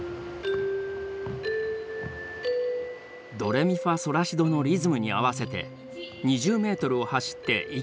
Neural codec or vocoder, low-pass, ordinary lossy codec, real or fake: none; none; none; real